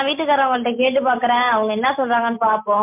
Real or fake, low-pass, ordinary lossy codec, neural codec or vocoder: real; 3.6 kHz; MP3, 32 kbps; none